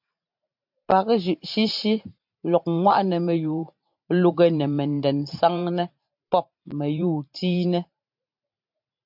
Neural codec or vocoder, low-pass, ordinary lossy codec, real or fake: vocoder, 44.1 kHz, 128 mel bands every 512 samples, BigVGAN v2; 5.4 kHz; MP3, 48 kbps; fake